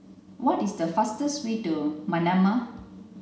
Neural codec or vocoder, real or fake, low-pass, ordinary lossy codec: none; real; none; none